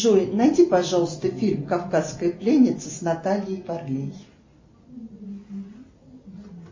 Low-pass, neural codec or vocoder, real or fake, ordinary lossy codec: 7.2 kHz; none; real; MP3, 32 kbps